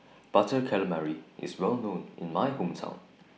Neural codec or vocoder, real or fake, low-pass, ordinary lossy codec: none; real; none; none